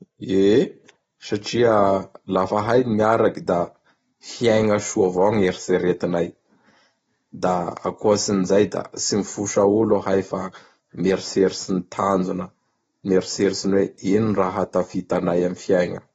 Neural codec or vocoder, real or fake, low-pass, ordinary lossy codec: none; real; 19.8 kHz; AAC, 24 kbps